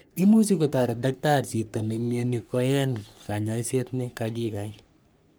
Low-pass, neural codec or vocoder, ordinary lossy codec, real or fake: none; codec, 44.1 kHz, 3.4 kbps, Pupu-Codec; none; fake